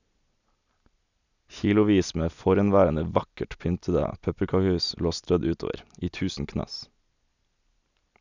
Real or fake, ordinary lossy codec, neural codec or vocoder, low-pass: real; none; none; 7.2 kHz